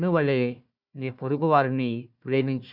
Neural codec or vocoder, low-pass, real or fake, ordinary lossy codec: codec, 16 kHz, 1 kbps, FunCodec, trained on Chinese and English, 50 frames a second; 5.4 kHz; fake; none